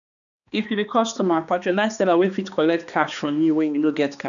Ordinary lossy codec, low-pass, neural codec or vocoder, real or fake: none; 7.2 kHz; codec, 16 kHz, 2 kbps, X-Codec, HuBERT features, trained on balanced general audio; fake